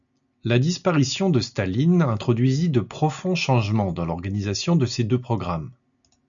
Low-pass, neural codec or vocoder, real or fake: 7.2 kHz; none; real